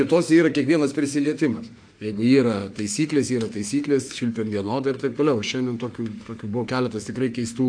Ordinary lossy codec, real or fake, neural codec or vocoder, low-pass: AAC, 64 kbps; fake; autoencoder, 48 kHz, 32 numbers a frame, DAC-VAE, trained on Japanese speech; 9.9 kHz